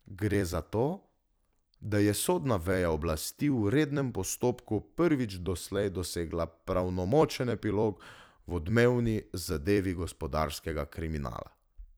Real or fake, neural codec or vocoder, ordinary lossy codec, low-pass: fake; vocoder, 44.1 kHz, 128 mel bands every 256 samples, BigVGAN v2; none; none